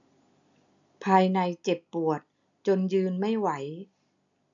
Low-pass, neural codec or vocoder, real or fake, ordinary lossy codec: 7.2 kHz; none; real; MP3, 96 kbps